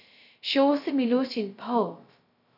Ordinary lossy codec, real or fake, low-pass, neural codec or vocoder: none; fake; 5.4 kHz; codec, 16 kHz, 0.2 kbps, FocalCodec